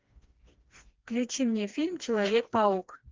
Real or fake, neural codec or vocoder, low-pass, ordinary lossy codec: fake; codec, 16 kHz, 2 kbps, FreqCodec, smaller model; 7.2 kHz; Opus, 32 kbps